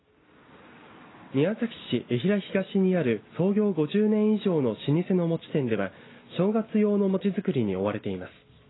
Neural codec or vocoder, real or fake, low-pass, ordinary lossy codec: none; real; 7.2 kHz; AAC, 16 kbps